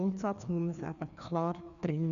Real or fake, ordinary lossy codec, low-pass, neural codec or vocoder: fake; none; 7.2 kHz; codec, 16 kHz, 2 kbps, FreqCodec, larger model